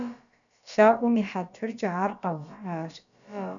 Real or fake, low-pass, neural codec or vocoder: fake; 7.2 kHz; codec, 16 kHz, about 1 kbps, DyCAST, with the encoder's durations